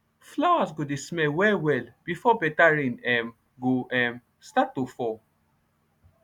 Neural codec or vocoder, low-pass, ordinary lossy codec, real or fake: none; 19.8 kHz; none; real